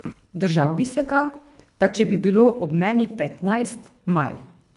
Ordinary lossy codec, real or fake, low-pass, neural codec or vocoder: none; fake; 10.8 kHz; codec, 24 kHz, 1.5 kbps, HILCodec